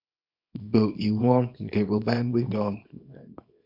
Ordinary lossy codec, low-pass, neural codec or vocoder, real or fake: MP3, 48 kbps; 5.4 kHz; codec, 24 kHz, 0.9 kbps, WavTokenizer, small release; fake